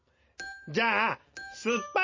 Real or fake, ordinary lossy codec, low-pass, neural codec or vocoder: real; MP3, 32 kbps; 7.2 kHz; none